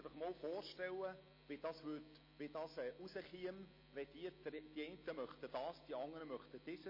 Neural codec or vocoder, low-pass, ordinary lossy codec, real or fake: none; 5.4 kHz; MP3, 24 kbps; real